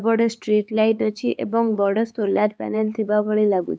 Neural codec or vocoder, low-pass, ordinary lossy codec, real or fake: codec, 16 kHz, 4 kbps, X-Codec, HuBERT features, trained on LibriSpeech; none; none; fake